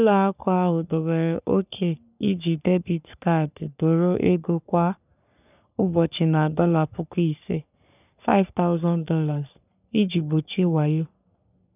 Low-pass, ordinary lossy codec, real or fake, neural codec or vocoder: 3.6 kHz; none; fake; codec, 44.1 kHz, 3.4 kbps, Pupu-Codec